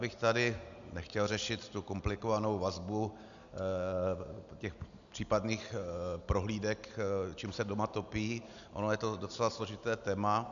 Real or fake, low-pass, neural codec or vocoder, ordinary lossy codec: real; 7.2 kHz; none; MP3, 96 kbps